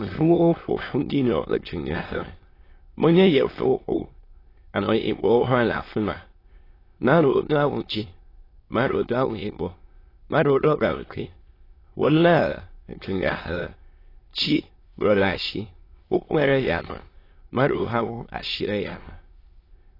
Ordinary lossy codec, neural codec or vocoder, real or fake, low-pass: AAC, 24 kbps; autoencoder, 22.05 kHz, a latent of 192 numbers a frame, VITS, trained on many speakers; fake; 5.4 kHz